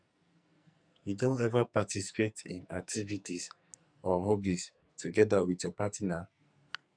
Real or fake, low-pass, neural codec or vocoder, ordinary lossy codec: fake; 9.9 kHz; codec, 44.1 kHz, 2.6 kbps, SNAC; none